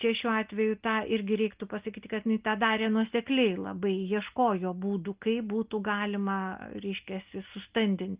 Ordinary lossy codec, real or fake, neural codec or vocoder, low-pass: Opus, 32 kbps; real; none; 3.6 kHz